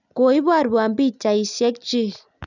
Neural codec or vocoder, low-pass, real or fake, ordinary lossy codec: none; 7.2 kHz; real; none